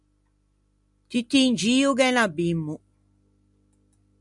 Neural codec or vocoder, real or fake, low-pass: none; real; 10.8 kHz